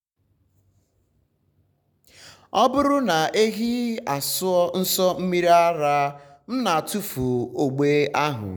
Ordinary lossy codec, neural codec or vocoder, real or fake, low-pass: none; none; real; none